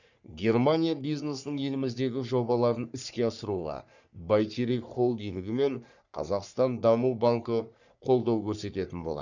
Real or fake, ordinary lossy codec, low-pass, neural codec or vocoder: fake; none; 7.2 kHz; codec, 44.1 kHz, 3.4 kbps, Pupu-Codec